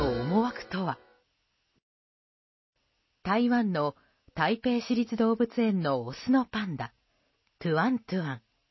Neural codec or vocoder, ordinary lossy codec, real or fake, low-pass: none; MP3, 24 kbps; real; 7.2 kHz